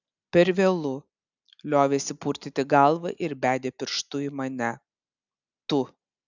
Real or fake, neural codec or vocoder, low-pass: real; none; 7.2 kHz